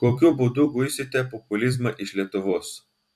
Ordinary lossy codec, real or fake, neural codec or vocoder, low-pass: MP3, 96 kbps; fake; vocoder, 44.1 kHz, 128 mel bands every 256 samples, BigVGAN v2; 14.4 kHz